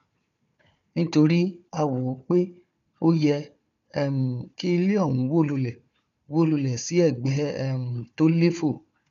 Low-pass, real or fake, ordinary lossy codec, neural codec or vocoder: 7.2 kHz; fake; none; codec, 16 kHz, 4 kbps, FunCodec, trained on Chinese and English, 50 frames a second